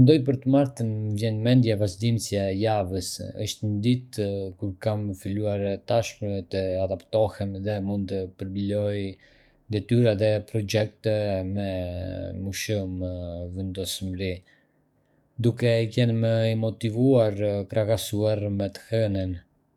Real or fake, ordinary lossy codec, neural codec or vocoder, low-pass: fake; none; codec, 44.1 kHz, 7.8 kbps, DAC; 19.8 kHz